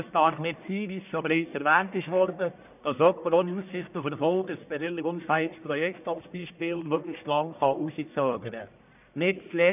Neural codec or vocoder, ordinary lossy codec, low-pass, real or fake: codec, 44.1 kHz, 1.7 kbps, Pupu-Codec; none; 3.6 kHz; fake